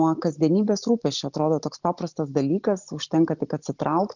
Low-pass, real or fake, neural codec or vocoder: 7.2 kHz; real; none